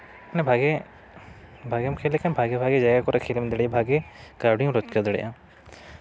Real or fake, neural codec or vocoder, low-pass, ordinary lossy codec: real; none; none; none